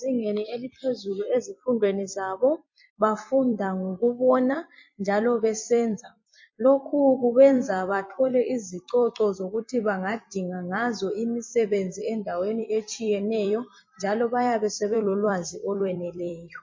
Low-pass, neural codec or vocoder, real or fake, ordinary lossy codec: 7.2 kHz; vocoder, 44.1 kHz, 128 mel bands every 256 samples, BigVGAN v2; fake; MP3, 32 kbps